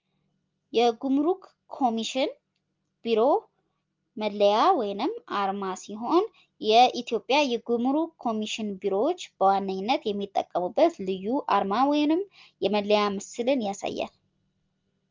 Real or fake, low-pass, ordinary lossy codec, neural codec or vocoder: real; 7.2 kHz; Opus, 32 kbps; none